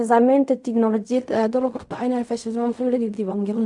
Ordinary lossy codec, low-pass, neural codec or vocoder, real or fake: none; 10.8 kHz; codec, 16 kHz in and 24 kHz out, 0.4 kbps, LongCat-Audio-Codec, fine tuned four codebook decoder; fake